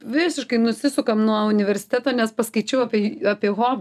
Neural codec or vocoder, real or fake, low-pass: none; real; 14.4 kHz